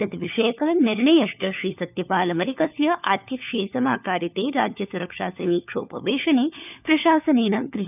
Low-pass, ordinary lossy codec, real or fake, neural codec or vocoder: 3.6 kHz; none; fake; codec, 16 kHz, 4 kbps, FunCodec, trained on LibriTTS, 50 frames a second